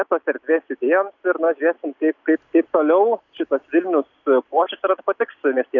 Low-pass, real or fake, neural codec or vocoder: 7.2 kHz; real; none